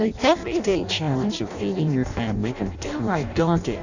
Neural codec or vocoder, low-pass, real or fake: codec, 16 kHz in and 24 kHz out, 0.6 kbps, FireRedTTS-2 codec; 7.2 kHz; fake